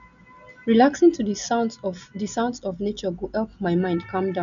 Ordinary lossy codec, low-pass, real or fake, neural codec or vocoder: none; 7.2 kHz; real; none